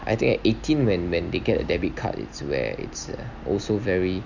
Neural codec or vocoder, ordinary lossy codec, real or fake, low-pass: none; none; real; 7.2 kHz